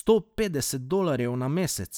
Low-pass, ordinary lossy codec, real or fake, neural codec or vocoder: none; none; real; none